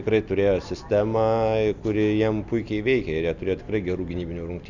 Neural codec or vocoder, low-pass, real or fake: none; 7.2 kHz; real